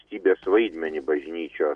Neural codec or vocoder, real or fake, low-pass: none; real; 9.9 kHz